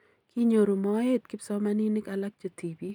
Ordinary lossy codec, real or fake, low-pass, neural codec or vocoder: none; real; 19.8 kHz; none